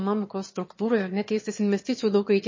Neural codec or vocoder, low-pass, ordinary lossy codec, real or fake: autoencoder, 22.05 kHz, a latent of 192 numbers a frame, VITS, trained on one speaker; 7.2 kHz; MP3, 32 kbps; fake